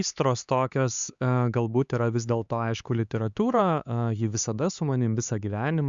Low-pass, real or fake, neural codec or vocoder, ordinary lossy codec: 7.2 kHz; fake; codec, 16 kHz, 4 kbps, X-Codec, HuBERT features, trained on LibriSpeech; Opus, 64 kbps